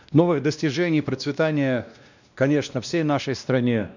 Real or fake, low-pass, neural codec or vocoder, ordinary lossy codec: fake; 7.2 kHz; codec, 16 kHz, 1 kbps, X-Codec, WavLM features, trained on Multilingual LibriSpeech; none